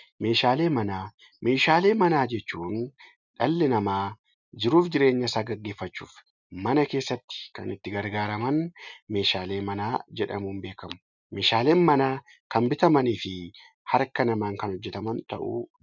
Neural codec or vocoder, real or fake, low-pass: none; real; 7.2 kHz